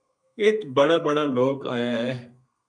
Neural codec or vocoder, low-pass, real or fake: codec, 32 kHz, 1.9 kbps, SNAC; 9.9 kHz; fake